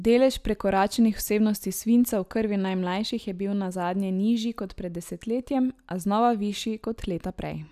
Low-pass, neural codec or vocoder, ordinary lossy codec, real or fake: 14.4 kHz; none; none; real